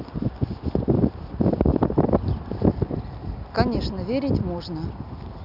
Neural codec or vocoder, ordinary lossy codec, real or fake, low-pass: none; none; real; 5.4 kHz